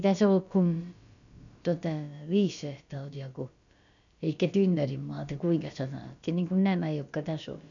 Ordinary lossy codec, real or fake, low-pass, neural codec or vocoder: none; fake; 7.2 kHz; codec, 16 kHz, about 1 kbps, DyCAST, with the encoder's durations